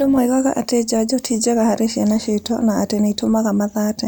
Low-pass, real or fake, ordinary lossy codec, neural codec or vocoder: none; real; none; none